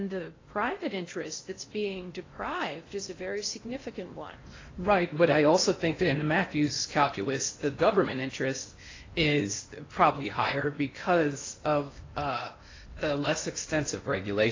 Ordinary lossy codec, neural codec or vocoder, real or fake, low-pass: AAC, 32 kbps; codec, 16 kHz in and 24 kHz out, 0.8 kbps, FocalCodec, streaming, 65536 codes; fake; 7.2 kHz